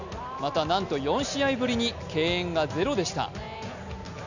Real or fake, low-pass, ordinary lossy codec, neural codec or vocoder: real; 7.2 kHz; none; none